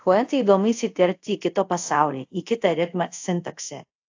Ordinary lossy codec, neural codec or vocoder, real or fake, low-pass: AAC, 48 kbps; codec, 24 kHz, 0.5 kbps, DualCodec; fake; 7.2 kHz